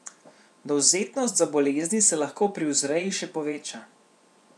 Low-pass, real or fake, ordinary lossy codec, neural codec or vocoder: none; fake; none; vocoder, 24 kHz, 100 mel bands, Vocos